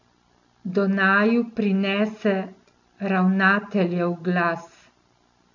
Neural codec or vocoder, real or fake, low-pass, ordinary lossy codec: none; real; 7.2 kHz; none